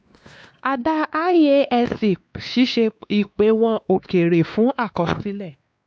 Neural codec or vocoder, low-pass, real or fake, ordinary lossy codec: codec, 16 kHz, 2 kbps, X-Codec, WavLM features, trained on Multilingual LibriSpeech; none; fake; none